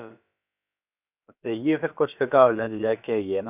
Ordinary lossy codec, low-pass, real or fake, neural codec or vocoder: none; 3.6 kHz; fake; codec, 16 kHz, about 1 kbps, DyCAST, with the encoder's durations